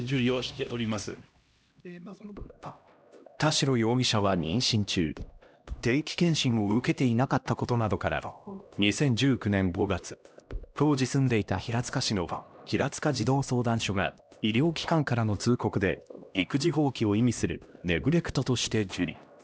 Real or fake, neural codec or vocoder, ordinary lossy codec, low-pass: fake; codec, 16 kHz, 1 kbps, X-Codec, HuBERT features, trained on LibriSpeech; none; none